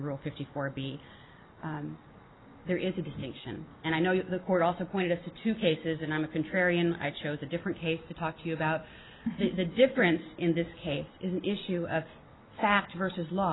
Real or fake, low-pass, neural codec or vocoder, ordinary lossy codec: real; 7.2 kHz; none; AAC, 16 kbps